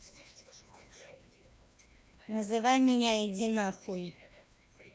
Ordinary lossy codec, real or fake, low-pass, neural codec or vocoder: none; fake; none; codec, 16 kHz, 1 kbps, FreqCodec, larger model